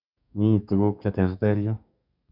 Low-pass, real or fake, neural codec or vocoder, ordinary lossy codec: 5.4 kHz; fake; codec, 32 kHz, 1.9 kbps, SNAC; none